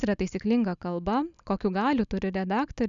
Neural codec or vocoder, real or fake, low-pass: none; real; 7.2 kHz